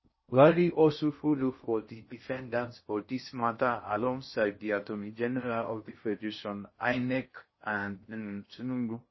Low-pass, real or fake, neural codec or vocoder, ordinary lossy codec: 7.2 kHz; fake; codec, 16 kHz in and 24 kHz out, 0.6 kbps, FocalCodec, streaming, 4096 codes; MP3, 24 kbps